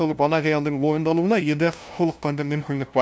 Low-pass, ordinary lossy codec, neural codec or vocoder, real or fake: none; none; codec, 16 kHz, 0.5 kbps, FunCodec, trained on LibriTTS, 25 frames a second; fake